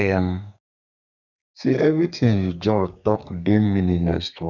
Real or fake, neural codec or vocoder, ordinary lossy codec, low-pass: fake; codec, 32 kHz, 1.9 kbps, SNAC; none; 7.2 kHz